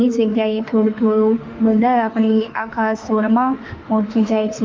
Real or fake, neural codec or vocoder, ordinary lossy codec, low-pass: fake; autoencoder, 48 kHz, 32 numbers a frame, DAC-VAE, trained on Japanese speech; Opus, 32 kbps; 7.2 kHz